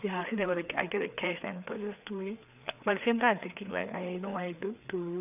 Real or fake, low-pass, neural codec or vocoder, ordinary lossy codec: fake; 3.6 kHz; codec, 16 kHz, 4 kbps, FreqCodec, larger model; none